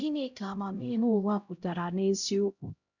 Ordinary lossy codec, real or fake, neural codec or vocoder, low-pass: none; fake; codec, 16 kHz, 0.5 kbps, X-Codec, HuBERT features, trained on LibriSpeech; 7.2 kHz